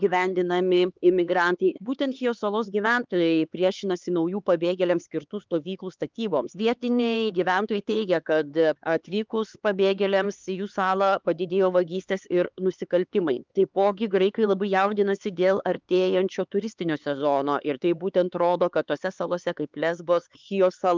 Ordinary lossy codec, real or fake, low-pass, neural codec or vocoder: Opus, 24 kbps; fake; 7.2 kHz; codec, 16 kHz, 4 kbps, X-Codec, HuBERT features, trained on LibriSpeech